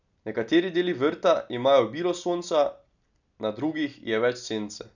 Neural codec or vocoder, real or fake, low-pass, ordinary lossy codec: none; real; 7.2 kHz; none